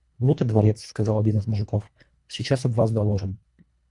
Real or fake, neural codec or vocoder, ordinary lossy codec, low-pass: fake; codec, 24 kHz, 1.5 kbps, HILCodec; MP3, 96 kbps; 10.8 kHz